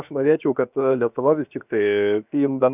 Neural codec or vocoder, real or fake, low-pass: codec, 16 kHz, 0.7 kbps, FocalCodec; fake; 3.6 kHz